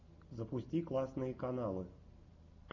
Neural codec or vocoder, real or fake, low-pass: none; real; 7.2 kHz